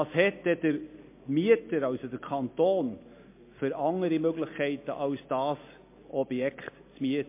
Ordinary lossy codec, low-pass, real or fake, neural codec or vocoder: MP3, 24 kbps; 3.6 kHz; real; none